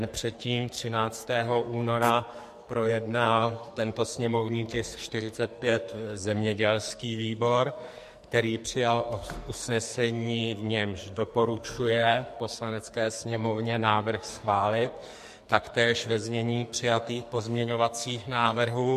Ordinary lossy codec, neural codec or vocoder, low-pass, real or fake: MP3, 64 kbps; codec, 44.1 kHz, 2.6 kbps, SNAC; 14.4 kHz; fake